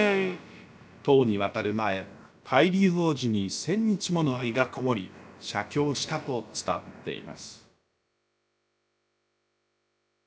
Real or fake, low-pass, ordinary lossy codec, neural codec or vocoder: fake; none; none; codec, 16 kHz, about 1 kbps, DyCAST, with the encoder's durations